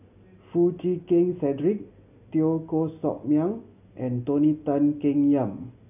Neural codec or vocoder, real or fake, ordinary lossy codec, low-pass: none; real; none; 3.6 kHz